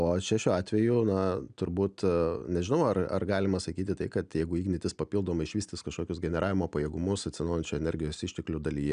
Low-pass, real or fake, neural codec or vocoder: 9.9 kHz; real; none